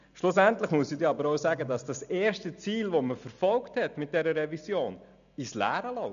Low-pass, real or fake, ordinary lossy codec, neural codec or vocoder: 7.2 kHz; real; none; none